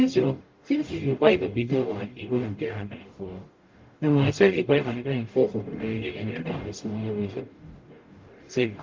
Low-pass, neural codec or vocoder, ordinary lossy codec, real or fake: 7.2 kHz; codec, 44.1 kHz, 0.9 kbps, DAC; Opus, 32 kbps; fake